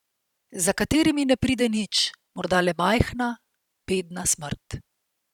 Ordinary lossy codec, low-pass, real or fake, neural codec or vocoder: none; 19.8 kHz; fake; vocoder, 44.1 kHz, 128 mel bands every 512 samples, BigVGAN v2